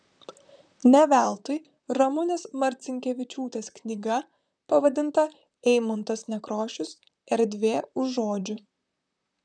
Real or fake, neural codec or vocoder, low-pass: fake; vocoder, 44.1 kHz, 128 mel bands, Pupu-Vocoder; 9.9 kHz